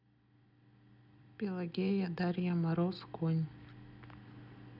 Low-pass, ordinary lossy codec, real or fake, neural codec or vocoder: 5.4 kHz; none; real; none